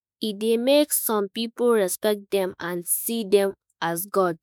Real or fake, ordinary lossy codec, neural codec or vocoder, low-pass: fake; none; autoencoder, 48 kHz, 32 numbers a frame, DAC-VAE, trained on Japanese speech; none